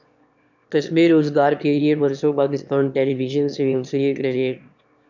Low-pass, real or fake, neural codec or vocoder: 7.2 kHz; fake; autoencoder, 22.05 kHz, a latent of 192 numbers a frame, VITS, trained on one speaker